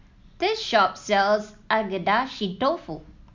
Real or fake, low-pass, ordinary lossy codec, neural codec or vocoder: real; 7.2 kHz; MP3, 64 kbps; none